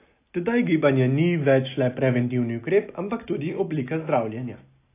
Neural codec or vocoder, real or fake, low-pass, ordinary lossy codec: none; real; 3.6 kHz; AAC, 24 kbps